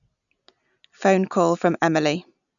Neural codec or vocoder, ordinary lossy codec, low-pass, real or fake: none; none; 7.2 kHz; real